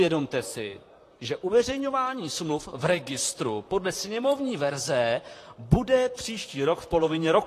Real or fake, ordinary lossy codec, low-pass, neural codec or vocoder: fake; AAC, 48 kbps; 14.4 kHz; vocoder, 44.1 kHz, 128 mel bands, Pupu-Vocoder